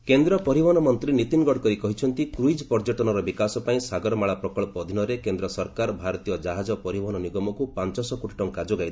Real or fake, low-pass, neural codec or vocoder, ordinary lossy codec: real; none; none; none